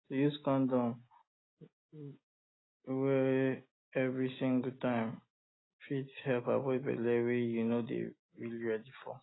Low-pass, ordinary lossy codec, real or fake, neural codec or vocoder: 7.2 kHz; AAC, 16 kbps; real; none